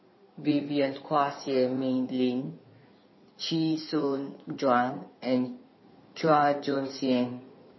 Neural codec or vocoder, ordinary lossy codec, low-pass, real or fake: codec, 16 kHz in and 24 kHz out, 2.2 kbps, FireRedTTS-2 codec; MP3, 24 kbps; 7.2 kHz; fake